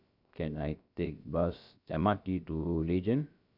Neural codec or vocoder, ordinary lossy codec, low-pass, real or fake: codec, 16 kHz, about 1 kbps, DyCAST, with the encoder's durations; none; 5.4 kHz; fake